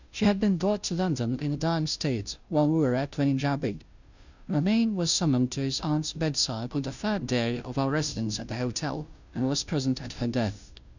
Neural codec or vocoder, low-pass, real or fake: codec, 16 kHz, 0.5 kbps, FunCodec, trained on Chinese and English, 25 frames a second; 7.2 kHz; fake